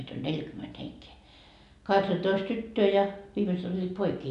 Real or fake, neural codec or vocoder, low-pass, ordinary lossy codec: real; none; 10.8 kHz; none